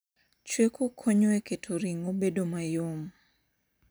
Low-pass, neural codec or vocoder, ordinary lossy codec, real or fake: none; none; none; real